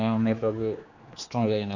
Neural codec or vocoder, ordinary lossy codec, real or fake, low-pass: codec, 16 kHz, 2 kbps, X-Codec, HuBERT features, trained on general audio; none; fake; 7.2 kHz